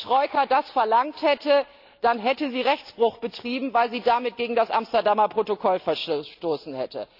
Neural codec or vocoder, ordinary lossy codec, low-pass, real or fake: none; AAC, 48 kbps; 5.4 kHz; real